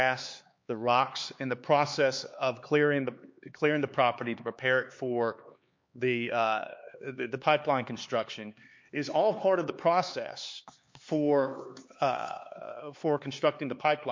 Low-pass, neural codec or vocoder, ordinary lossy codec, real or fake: 7.2 kHz; codec, 16 kHz, 4 kbps, X-Codec, HuBERT features, trained on LibriSpeech; MP3, 48 kbps; fake